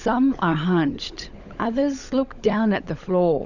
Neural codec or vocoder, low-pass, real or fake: codec, 16 kHz, 16 kbps, FunCodec, trained on LibriTTS, 50 frames a second; 7.2 kHz; fake